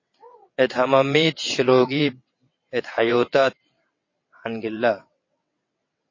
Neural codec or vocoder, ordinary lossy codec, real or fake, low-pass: vocoder, 22.05 kHz, 80 mel bands, WaveNeXt; MP3, 32 kbps; fake; 7.2 kHz